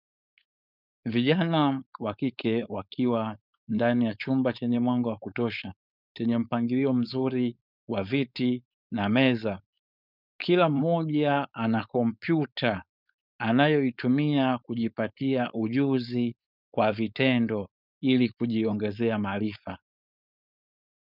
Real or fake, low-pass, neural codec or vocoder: fake; 5.4 kHz; codec, 16 kHz, 4.8 kbps, FACodec